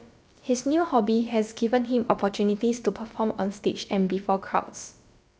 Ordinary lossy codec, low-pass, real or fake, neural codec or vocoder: none; none; fake; codec, 16 kHz, about 1 kbps, DyCAST, with the encoder's durations